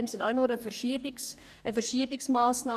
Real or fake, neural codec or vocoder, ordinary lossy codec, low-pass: fake; codec, 44.1 kHz, 2.6 kbps, DAC; none; 14.4 kHz